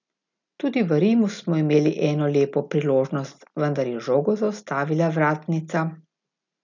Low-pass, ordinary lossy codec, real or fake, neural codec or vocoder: 7.2 kHz; none; real; none